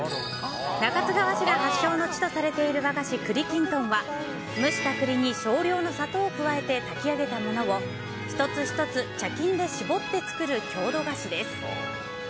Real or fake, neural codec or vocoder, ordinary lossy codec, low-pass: real; none; none; none